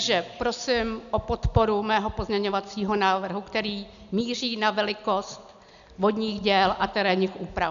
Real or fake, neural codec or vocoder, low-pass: real; none; 7.2 kHz